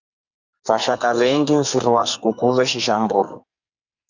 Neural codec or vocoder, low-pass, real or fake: codec, 44.1 kHz, 2.6 kbps, SNAC; 7.2 kHz; fake